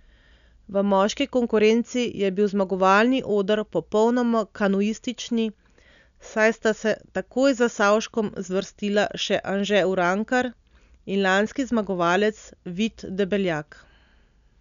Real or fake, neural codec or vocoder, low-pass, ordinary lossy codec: real; none; 7.2 kHz; none